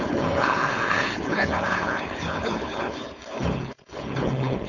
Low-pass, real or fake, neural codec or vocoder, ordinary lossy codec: 7.2 kHz; fake; codec, 16 kHz, 4.8 kbps, FACodec; none